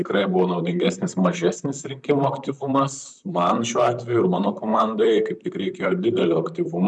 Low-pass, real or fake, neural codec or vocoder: 10.8 kHz; fake; vocoder, 44.1 kHz, 128 mel bands, Pupu-Vocoder